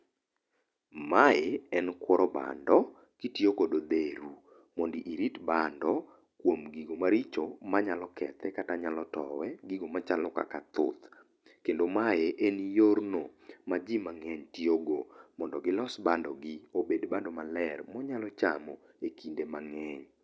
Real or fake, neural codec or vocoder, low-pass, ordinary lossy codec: real; none; none; none